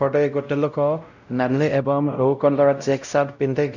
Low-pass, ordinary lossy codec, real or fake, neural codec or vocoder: 7.2 kHz; none; fake; codec, 16 kHz, 0.5 kbps, X-Codec, WavLM features, trained on Multilingual LibriSpeech